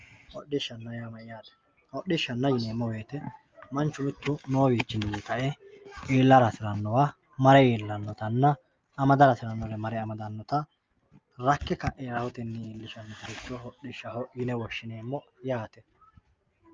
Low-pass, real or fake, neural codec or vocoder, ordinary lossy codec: 7.2 kHz; real; none; Opus, 24 kbps